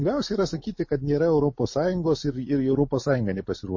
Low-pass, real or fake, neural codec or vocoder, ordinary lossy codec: 7.2 kHz; real; none; MP3, 32 kbps